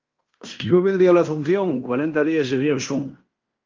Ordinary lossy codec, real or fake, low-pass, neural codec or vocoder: Opus, 32 kbps; fake; 7.2 kHz; codec, 16 kHz in and 24 kHz out, 0.9 kbps, LongCat-Audio-Codec, fine tuned four codebook decoder